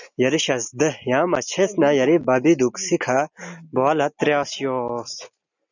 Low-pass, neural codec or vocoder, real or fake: 7.2 kHz; none; real